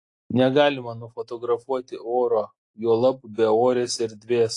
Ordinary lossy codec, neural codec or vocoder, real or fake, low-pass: AAC, 48 kbps; none; real; 10.8 kHz